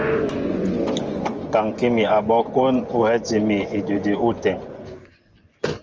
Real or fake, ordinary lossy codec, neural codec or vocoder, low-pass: fake; Opus, 24 kbps; vocoder, 24 kHz, 100 mel bands, Vocos; 7.2 kHz